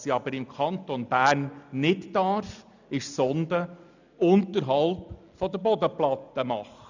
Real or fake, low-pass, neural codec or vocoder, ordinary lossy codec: real; 7.2 kHz; none; none